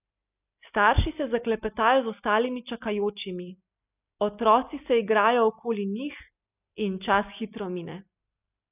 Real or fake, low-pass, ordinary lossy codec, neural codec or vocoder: real; 3.6 kHz; none; none